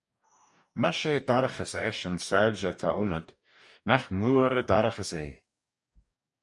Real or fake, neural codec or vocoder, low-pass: fake; codec, 44.1 kHz, 2.6 kbps, DAC; 10.8 kHz